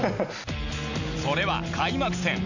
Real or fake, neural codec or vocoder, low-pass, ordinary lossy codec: real; none; 7.2 kHz; none